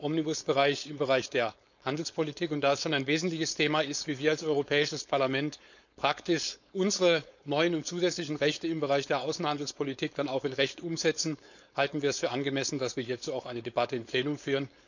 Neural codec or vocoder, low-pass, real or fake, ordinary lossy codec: codec, 16 kHz, 4.8 kbps, FACodec; 7.2 kHz; fake; none